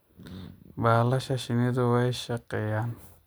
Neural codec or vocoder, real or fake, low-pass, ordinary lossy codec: none; real; none; none